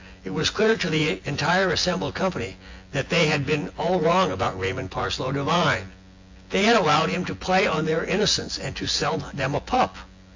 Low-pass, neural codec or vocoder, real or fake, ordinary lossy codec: 7.2 kHz; vocoder, 24 kHz, 100 mel bands, Vocos; fake; AAC, 48 kbps